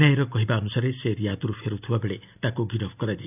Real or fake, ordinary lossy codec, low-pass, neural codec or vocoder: real; none; 3.6 kHz; none